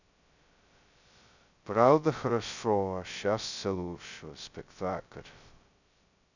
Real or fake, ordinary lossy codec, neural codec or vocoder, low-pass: fake; none; codec, 16 kHz, 0.2 kbps, FocalCodec; 7.2 kHz